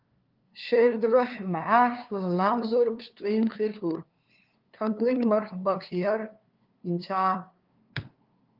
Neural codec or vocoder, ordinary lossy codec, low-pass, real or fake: codec, 16 kHz, 2 kbps, FunCodec, trained on LibriTTS, 25 frames a second; Opus, 32 kbps; 5.4 kHz; fake